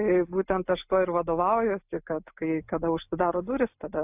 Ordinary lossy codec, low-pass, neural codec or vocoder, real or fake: AAC, 32 kbps; 3.6 kHz; none; real